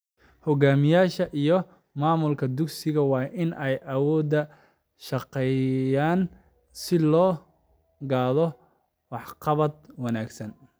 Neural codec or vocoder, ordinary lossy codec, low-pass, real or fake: none; none; none; real